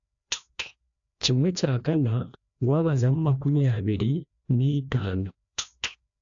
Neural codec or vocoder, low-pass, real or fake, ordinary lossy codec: codec, 16 kHz, 1 kbps, FreqCodec, larger model; 7.2 kHz; fake; AAC, 64 kbps